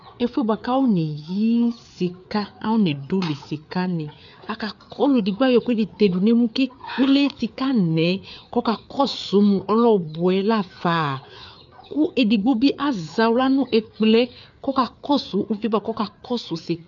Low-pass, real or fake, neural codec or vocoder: 7.2 kHz; fake; codec, 16 kHz, 4 kbps, FreqCodec, larger model